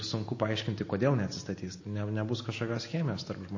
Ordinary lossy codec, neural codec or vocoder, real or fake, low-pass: MP3, 32 kbps; none; real; 7.2 kHz